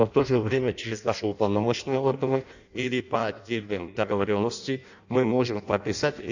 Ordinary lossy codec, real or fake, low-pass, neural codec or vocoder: none; fake; 7.2 kHz; codec, 16 kHz in and 24 kHz out, 0.6 kbps, FireRedTTS-2 codec